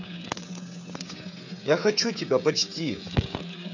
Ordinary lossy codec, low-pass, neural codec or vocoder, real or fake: none; 7.2 kHz; codec, 16 kHz, 16 kbps, FreqCodec, smaller model; fake